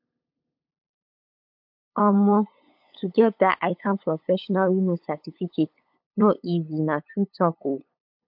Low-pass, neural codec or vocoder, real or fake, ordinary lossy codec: 5.4 kHz; codec, 16 kHz, 8 kbps, FunCodec, trained on LibriTTS, 25 frames a second; fake; MP3, 48 kbps